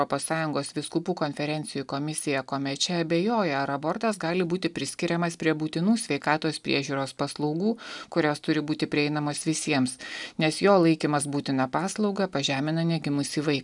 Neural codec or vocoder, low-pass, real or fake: none; 10.8 kHz; real